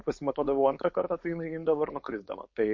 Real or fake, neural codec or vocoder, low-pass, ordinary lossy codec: fake; codec, 16 kHz, 4.8 kbps, FACodec; 7.2 kHz; MP3, 48 kbps